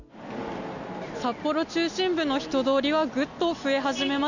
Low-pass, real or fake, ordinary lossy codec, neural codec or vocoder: 7.2 kHz; real; none; none